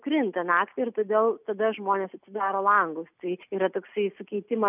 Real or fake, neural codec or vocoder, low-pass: real; none; 3.6 kHz